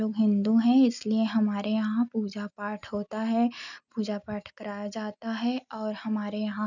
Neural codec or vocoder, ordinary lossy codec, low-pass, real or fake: none; none; 7.2 kHz; real